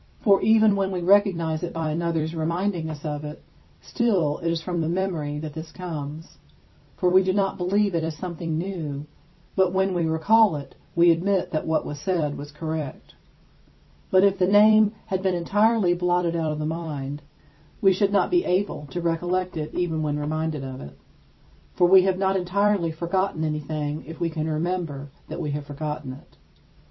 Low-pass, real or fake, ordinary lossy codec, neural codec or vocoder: 7.2 kHz; fake; MP3, 24 kbps; vocoder, 44.1 kHz, 128 mel bands every 256 samples, BigVGAN v2